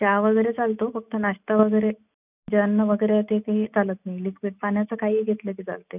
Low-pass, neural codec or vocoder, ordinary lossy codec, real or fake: 3.6 kHz; none; none; real